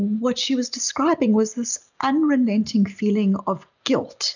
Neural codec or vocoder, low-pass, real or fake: none; 7.2 kHz; real